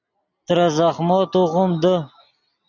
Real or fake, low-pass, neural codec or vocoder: real; 7.2 kHz; none